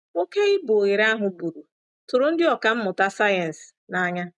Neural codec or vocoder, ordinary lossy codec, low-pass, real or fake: none; none; 10.8 kHz; real